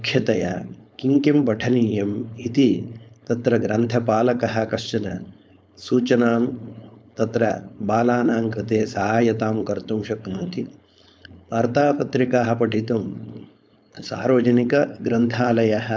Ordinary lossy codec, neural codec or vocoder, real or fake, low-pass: none; codec, 16 kHz, 4.8 kbps, FACodec; fake; none